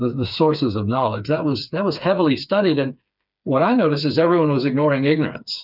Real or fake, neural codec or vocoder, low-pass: fake; codec, 16 kHz, 4 kbps, FreqCodec, smaller model; 5.4 kHz